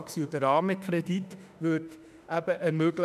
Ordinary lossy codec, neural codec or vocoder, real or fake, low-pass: none; autoencoder, 48 kHz, 32 numbers a frame, DAC-VAE, trained on Japanese speech; fake; 14.4 kHz